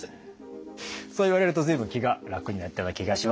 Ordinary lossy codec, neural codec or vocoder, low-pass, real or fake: none; none; none; real